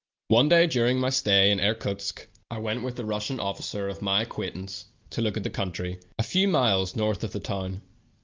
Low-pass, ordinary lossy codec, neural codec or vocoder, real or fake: 7.2 kHz; Opus, 24 kbps; none; real